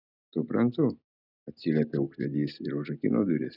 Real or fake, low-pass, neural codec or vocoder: real; 5.4 kHz; none